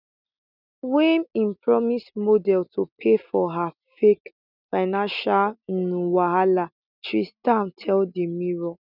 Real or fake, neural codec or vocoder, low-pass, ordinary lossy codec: real; none; 5.4 kHz; none